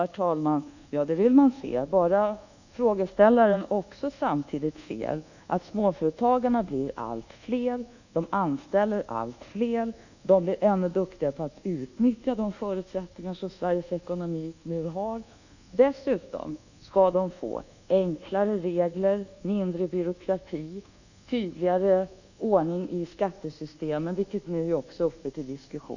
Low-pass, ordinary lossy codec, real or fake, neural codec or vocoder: 7.2 kHz; none; fake; codec, 24 kHz, 1.2 kbps, DualCodec